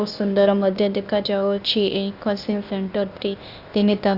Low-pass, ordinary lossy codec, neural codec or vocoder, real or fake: 5.4 kHz; Opus, 64 kbps; codec, 16 kHz, 0.8 kbps, ZipCodec; fake